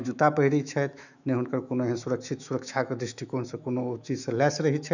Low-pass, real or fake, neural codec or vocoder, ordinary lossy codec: 7.2 kHz; fake; autoencoder, 48 kHz, 128 numbers a frame, DAC-VAE, trained on Japanese speech; none